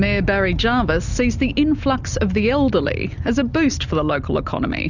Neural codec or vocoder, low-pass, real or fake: none; 7.2 kHz; real